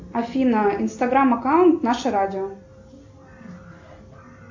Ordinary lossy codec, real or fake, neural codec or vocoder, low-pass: AAC, 48 kbps; real; none; 7.2 kHz